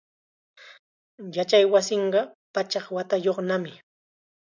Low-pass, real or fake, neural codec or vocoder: 7.2 kHz; real; none